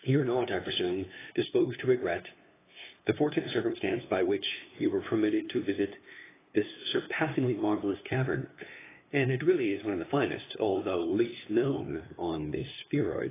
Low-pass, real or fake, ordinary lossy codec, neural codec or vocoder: 3.6 kHz; fake; AAC, 16 kbps; codec, 16 kHz, 2 kbps, FunCodec, trained on LibriTTS, 25 frames a second